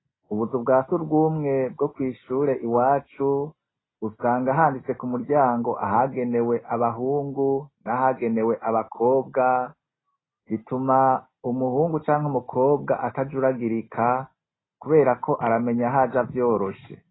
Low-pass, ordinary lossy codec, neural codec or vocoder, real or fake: 7.2 kHz; AAC, 16 kbps; none; real